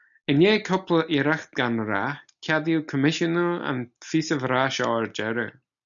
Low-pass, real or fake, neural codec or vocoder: 7.2 kHz; real; none